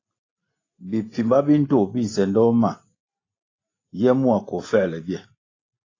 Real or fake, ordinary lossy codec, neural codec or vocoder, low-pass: real; AAC, 32 kbps; none; 7.2 kHz